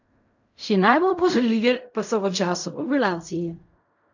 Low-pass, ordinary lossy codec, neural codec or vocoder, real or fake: 7.2 kHz; none; codec, 16 kHz in and 24 kHz out, 0.4 kbps, LongCat-Audio-Codec, fine tuned four codebook decoder; fake